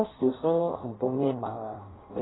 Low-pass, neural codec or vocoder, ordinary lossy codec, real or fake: 7.2 kHz; codec, 16 kHz in and 24 kHz out, 0.6 kbps, FireRedTTS-2 codec; AAC, 16 kbps; fake